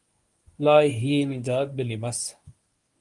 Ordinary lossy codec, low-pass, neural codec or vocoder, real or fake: Opus, 32 kbps; 10.8 kHz; codec, 24 kHz, 0.9 kbps, WavTokenizer, medium speech release version 1; fake